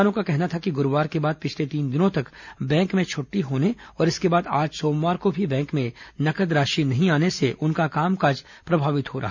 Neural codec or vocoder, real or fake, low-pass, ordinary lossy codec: none; real; 7.2 kHz; none